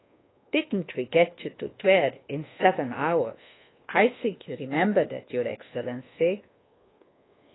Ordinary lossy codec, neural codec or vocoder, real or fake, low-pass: AAC, 16 kbps; codec, 24 kHz, 1.2 kbps, DualCodec; fake; 7.2 kHz